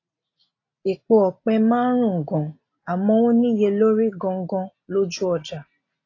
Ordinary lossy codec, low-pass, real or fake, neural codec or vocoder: AAC, 32 kbps; 7.2 kHz; real; none